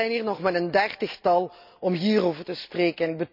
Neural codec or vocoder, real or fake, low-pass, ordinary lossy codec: none; real; 5.4 kHz; none